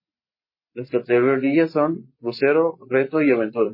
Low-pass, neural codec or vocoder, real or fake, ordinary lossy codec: 5.4 kHz; none; real; MP3, 24 kbps